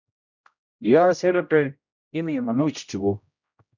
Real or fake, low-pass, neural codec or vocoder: fake; 7.2 kHz; codec, 16 kHz, 0.5 kbps, X-Codec, HuBERT features, trained on general audio